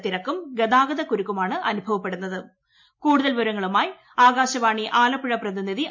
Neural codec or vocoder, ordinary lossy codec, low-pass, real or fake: none; MP3, 48 kbps; 7.2 kHz; real